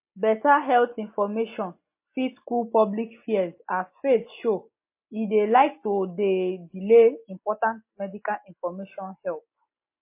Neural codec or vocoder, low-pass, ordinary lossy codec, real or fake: none; 3.6 kHz; MP3, 24 kbps; real